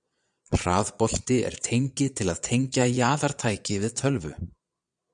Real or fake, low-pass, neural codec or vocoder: fake; 9.9 kHz; vocoder, 22.05 kHz, 80 mel bands, Vocos